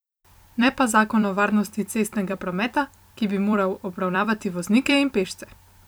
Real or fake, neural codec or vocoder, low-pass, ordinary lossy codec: fake; vocoder, 44.1 kHz, 128 mel bands every 256 samples, BigVGAN v2; none; none